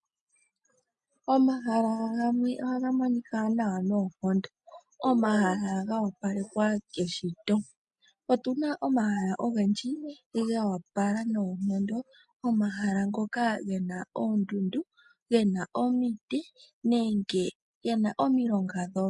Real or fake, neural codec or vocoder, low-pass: real; none; 10.8 kHz